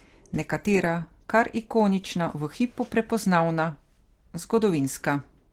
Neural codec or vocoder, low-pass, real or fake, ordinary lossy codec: none; 19.8 kHz; real; Opus, 16 kbps